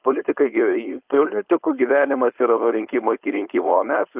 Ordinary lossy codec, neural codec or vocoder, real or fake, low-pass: Opus, 32 kbps; codec, 16 kHz, 4.8 kbps, FACodec; fake; 3.6 kHz